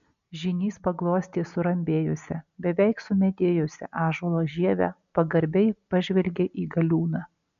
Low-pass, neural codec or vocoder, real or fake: 7.2 kHz; none; real